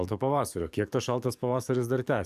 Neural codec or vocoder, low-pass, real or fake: codec, 44.1 kHz, 7.8 kbps, DAC; 14.4 kHz; fake